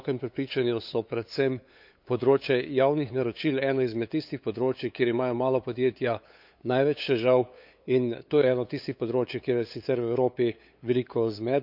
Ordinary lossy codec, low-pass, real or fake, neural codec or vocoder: none; 5.4 kHz; fake; codec, 16 kHz, 8 kbps, FunCodec, trained on LibriTTS, 25 frames a second